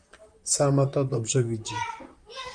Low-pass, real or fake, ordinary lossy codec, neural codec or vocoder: 9.9 kHz; fake; Opus, 32 kbps; vocoder, 44.1 kHz, 128 mel bands, Pupu-Vocoder